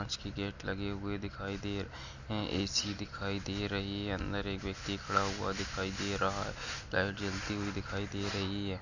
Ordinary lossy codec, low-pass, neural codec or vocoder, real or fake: none; 7.2 kHz; none; real